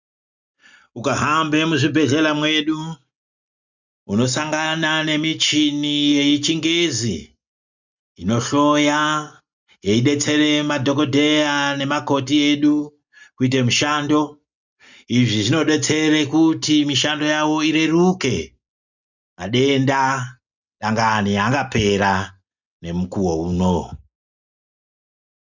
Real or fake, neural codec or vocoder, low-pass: real; none; 7.2 kHz